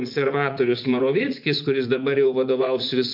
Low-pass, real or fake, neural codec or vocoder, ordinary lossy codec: 5.4 kHz; fake; vocoder, 44.1 kHz, 80 mel bands, Vocos; MP3, 48 kbps